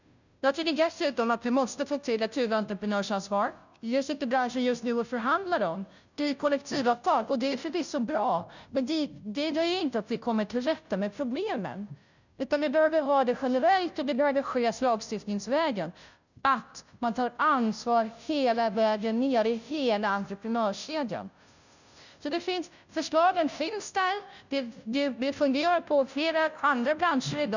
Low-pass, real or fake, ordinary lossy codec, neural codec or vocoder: 7.2 kHz; fake; none; codec, 16 kHz, 0.5 kbps, FunCodec, trained on Chinese and English, 25 frames a second